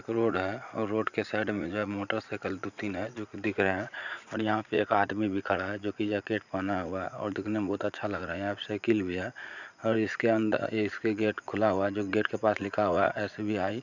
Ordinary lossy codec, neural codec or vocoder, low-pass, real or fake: none; vocoder, 44.1 kHz, 80 mel bands, Vocos; 7.2 kHz; fake